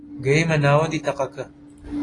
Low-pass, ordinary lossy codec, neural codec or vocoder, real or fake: 10.8 kHz; AAC, 32 kbps; none; real